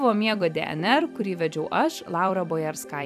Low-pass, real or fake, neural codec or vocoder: 14.4 kHz; fake; vocoder, 44.1 kHz, 128 mel bands every 256 samples, BigVGAN v2